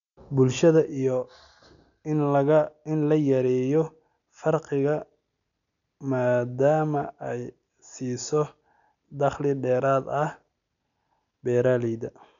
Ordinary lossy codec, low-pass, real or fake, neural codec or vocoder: none; 7.2 kHz; real; none